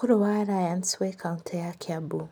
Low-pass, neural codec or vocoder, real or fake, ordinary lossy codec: none; none; real; none